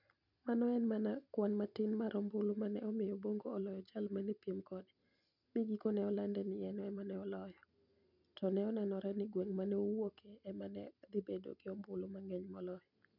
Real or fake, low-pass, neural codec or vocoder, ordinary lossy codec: real; 5.4 kHz; none; none